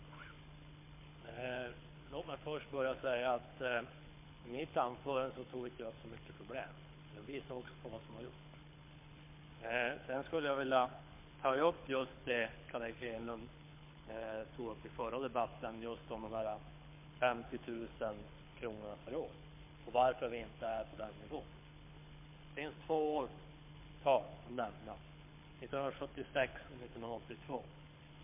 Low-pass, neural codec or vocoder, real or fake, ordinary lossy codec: 3.6 kHz; codec, 24 kHz, 6 kbps, HILCodec; fake; MP3, 24 kbps